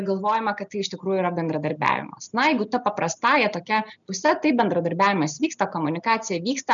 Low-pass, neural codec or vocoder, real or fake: 7.2 kHz; none; real